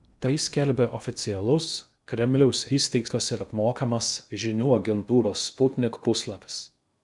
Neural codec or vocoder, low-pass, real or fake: codec, 16 kHz in and 24 kHz out, 0.6 kbps, FocalCodec, streaming, 2048 codes; 10.8 kHz; fake